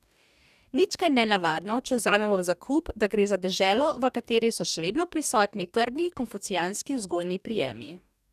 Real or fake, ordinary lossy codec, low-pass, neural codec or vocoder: fake; none; 14.4 kHz; codec, 44.1 kHz, 2.6 kbps, DAC